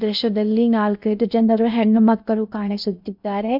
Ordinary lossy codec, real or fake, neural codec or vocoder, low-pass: none; fake; codec, 16 kHz in and 24 kHz out, 0.6 kbps, FocalCodec, streaming, 2048 codes; 5.4 kHz